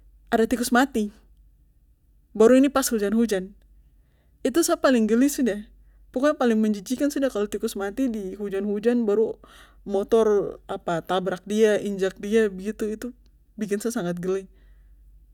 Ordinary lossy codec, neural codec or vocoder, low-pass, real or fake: none; none; 19.8 kHz; real